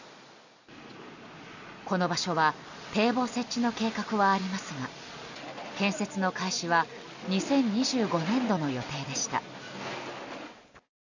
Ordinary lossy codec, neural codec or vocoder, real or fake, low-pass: none; none; real; 7.2 kHz